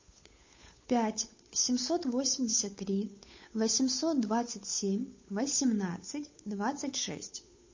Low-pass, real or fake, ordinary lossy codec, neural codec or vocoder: 7.2 kHz; fake; MP3, 32 kbps; codec, 16 kHz, 8 kbps, FunCodec, trained on Chinese and English, 25 frames a second